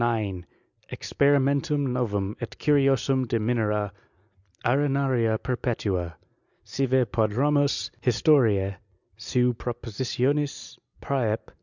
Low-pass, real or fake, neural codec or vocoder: 7.2 kHz; real; none